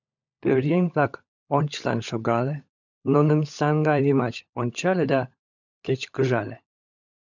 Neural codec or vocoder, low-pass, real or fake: codec, 16 kHz, 16 kbps, FunCodec, trained on LibriTTS, 50 frames a second; 7.2 kHz; fake